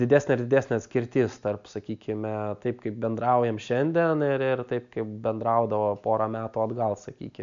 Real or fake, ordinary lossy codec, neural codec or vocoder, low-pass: real; MP3, 64 kbps; none; 7.2 kHz